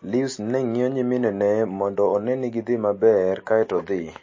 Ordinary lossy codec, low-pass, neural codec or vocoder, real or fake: MP3, 32 kbps; 7.2 kHz; none; real